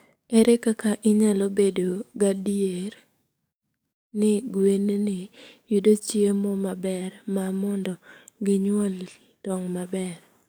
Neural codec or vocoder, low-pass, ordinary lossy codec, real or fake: codec, 44.1 kHz, 7.8 kbps, DAC; none; none; fake